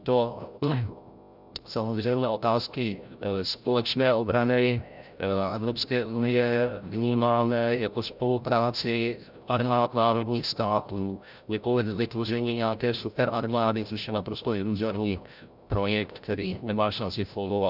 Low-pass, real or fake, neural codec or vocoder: 5.4 kHz; fake; codec, 16 kHz, 0.5 kbps, FreqCodec, larger model